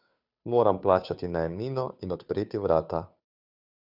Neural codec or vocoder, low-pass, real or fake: codec, 16 kHz, 2 kbps, FunCodec, trained on Chinese and English, 25 frames a second; 5.4 kHz; fake